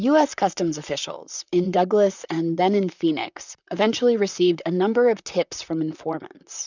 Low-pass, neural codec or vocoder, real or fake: 7.2 kHz; vocoder, 44.1 kHz, 128 mel bands, Pupu-Vocoder; fake